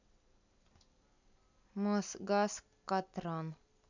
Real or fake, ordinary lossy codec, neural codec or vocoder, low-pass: real; none; none; 7.2 kHz